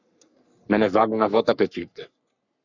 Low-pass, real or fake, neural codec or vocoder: 7.2 kHz; fake; codec, 44.1 kHz, 3.4 kbps, Pupu-Codec